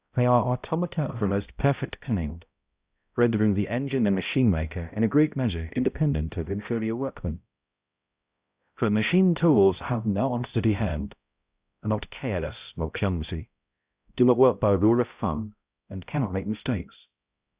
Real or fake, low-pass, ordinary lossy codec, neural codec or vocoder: fake; 3.6 kHz; Opus, 64 kbps; codec, 16 kHz, 0.5 kbps, X-Codec, HuBERT features, trained on balanced general audio